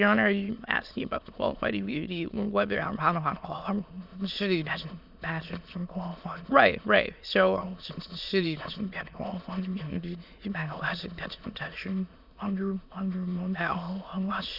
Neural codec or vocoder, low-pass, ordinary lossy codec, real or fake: autoencoder, 22.05 kHz, a latent of 192 numbers a frame, VITS, trained on many speakers; 5.4 kHz; Opus, 64 kbps; fake